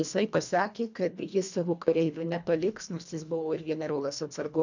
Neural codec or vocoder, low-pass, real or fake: codec, 24 kHz, 1.5 kbps, HILCodec; 7.2 kHz; fake